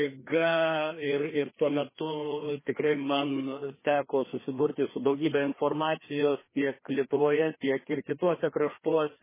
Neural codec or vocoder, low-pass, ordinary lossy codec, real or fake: codec, 16 kHz, 2 kbps, FreqCodec, larger model; 3.6 kHz; MP3, 16 kbps; fake